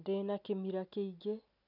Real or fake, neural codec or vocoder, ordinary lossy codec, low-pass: real; none; none; 5.4 kHz